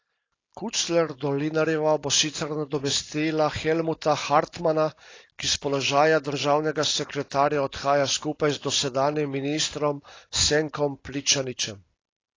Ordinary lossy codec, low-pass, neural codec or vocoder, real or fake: AAC, 32 kbps; 7.2 kHz; none; real